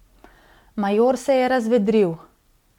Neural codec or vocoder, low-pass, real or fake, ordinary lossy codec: none; 19.8 kHz; real; MP3, 96 kbps